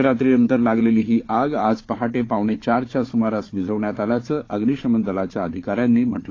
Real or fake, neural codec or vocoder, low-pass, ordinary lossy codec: fake; codec, 16 kHz, 4 kbps, FunCodec, trained on Chinese and English, 50 frames a second; 7.2 kHz; AAC, 32 kbps